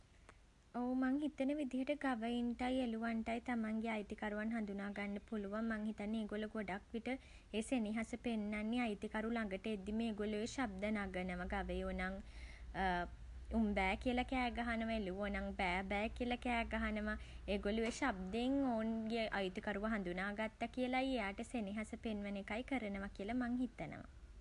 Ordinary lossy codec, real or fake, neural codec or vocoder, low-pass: AAC, 64 kbps; real; none; 10.8 kHz